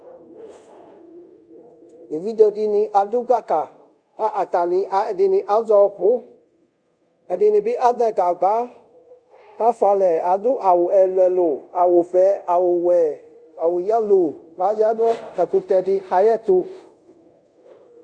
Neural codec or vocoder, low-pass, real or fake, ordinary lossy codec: codec, 24 kHz, 0.5 kbps, DualCodec; 9.9 kHz; fake; Opus, 64 kbps